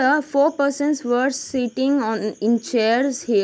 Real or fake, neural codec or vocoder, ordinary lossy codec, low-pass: real; none; none; none